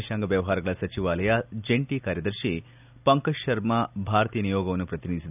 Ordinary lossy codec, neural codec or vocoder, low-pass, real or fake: none; none; 3.6 kHz; real